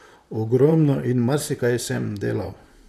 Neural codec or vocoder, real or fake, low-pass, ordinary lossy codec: vocoder, 44.1 kHz, 128 mel bands, Pupu-Vocoder; fake; 14.4 kHz; none